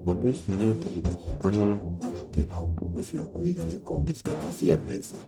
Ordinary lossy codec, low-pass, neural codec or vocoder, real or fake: none; 19.8 kHz; codec, 44.1 kHz, 0.9 kbps, DAC; fake